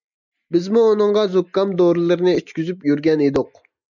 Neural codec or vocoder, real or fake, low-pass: none; real; 7.2 kHz